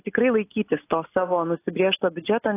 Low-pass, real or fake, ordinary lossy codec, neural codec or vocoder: 3.6 kHz; real; AAC, 16 kbps; none